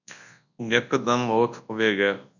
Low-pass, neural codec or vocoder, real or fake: 7.2 kHz; codec, 24 kHz, 0.9 kbps, WavTokenizer, large speech release; fake